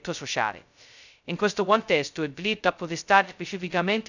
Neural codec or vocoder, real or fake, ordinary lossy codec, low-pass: codec, 16 kHz, 0.2 kbps, FocalCodec; fake; none; 7.2 kHz